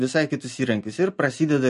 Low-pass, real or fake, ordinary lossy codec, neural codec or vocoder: 10.8 kHz; real; MP3, 48 kbps; none